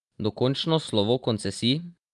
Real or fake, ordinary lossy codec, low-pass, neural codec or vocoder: real; Opus, 32 kbps; 10.8 kHz; none